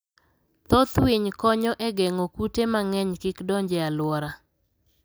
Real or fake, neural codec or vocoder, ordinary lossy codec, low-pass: real; none; none; none